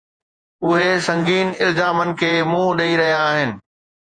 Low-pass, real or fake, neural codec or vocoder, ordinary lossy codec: 9.9 kHz; fake; vocoder, 48 kHz, 128 mel bands, Vocos; Opus, 64 kbps